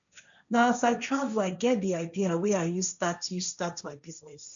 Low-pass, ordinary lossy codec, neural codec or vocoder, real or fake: 7.2 kHz; none; codec, 16 kHz, 1.1 kbps, Voila-Tokenizer; fake